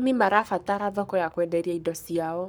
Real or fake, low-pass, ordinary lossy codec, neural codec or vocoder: fake; none; none; codec, 44.1 kHz, 7.8 kbps, Pupu-Codec